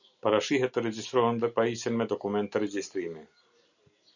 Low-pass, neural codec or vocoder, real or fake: 7.2 kHz; none; real